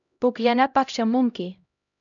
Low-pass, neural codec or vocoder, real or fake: 7.2 kHz; codec, 16 kHz, 0.5 kbps, X-Codec, HuBERT features, trained on LibriSpeech; fake